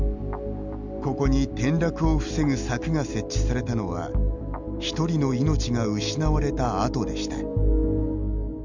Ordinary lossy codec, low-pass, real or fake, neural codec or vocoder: none; 7.2 kHz; real; none